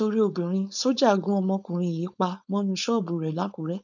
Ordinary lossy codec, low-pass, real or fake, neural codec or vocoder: none; 7.2 kHz; fake; codec, 16 kHz, 4.8 kbps, FACodec